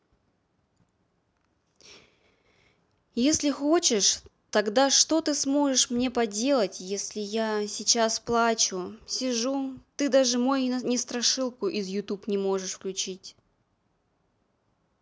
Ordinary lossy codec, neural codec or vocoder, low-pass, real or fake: none; none; none; real